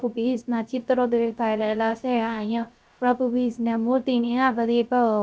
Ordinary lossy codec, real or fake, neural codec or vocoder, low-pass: none; fake; codec, 16 kHz, 0.3 kbps, FocalCodec; none